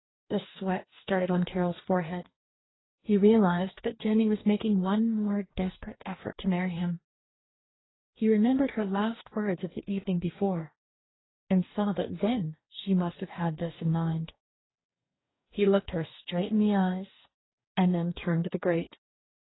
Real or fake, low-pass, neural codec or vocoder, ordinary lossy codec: fake; 7.2 kHz; codec, 44.1 kHz, 2.6 kbps, DAC; AAC, 16 kbps